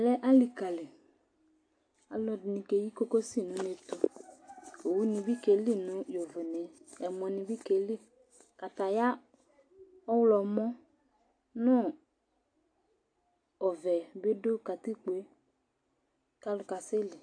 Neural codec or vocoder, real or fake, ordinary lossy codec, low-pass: none; real; AAC, 48 kbps; 9.9 kHz